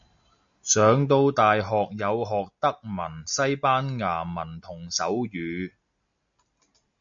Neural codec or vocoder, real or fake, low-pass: none; real; 7.2 kHz